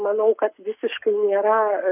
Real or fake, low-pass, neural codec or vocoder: fake; 3.6 kHz; codec, 44.1 kHz, 7.8 kbps, Pupu-Codec